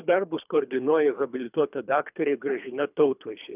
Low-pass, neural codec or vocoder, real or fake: 3.6 kHz; codec, 24 kHz, 3 kbps, HILCodec; fake